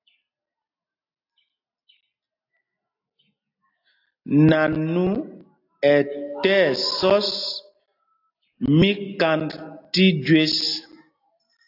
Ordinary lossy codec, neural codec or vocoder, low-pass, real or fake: AAC, 32 kbps; none; 5.4 kHz; real